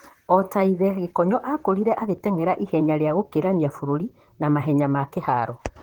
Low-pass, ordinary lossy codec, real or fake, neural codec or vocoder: 19.8 kHz; Opus, 16 kbps; fake; vocoder, 44.1 kHz, 128 mel bands, Pupu-Vocoder